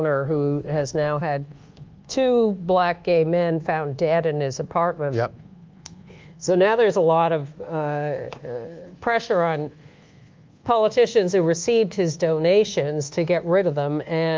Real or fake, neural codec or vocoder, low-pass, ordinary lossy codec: fake; codec, 24 kHz, 1.2 kbps, DualCodec; 7.2 kHz; Opus, 24 kbps